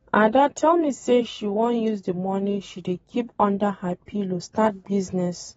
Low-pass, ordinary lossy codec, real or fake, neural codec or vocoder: 19.8 kHz; AAC, 24 kbps; fake; vocoder, 44.1 kHz, 128 mel bands every 512 samples, BigVGAN v2